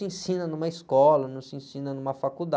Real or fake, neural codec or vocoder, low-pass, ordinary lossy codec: real; none; none; none